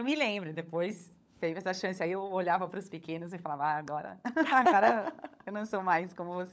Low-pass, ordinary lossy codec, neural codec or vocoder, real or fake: none; none; codec, 16 kHz, 16 kbps, FunCodec, trained on LibriTTS, 50 frames a second; fake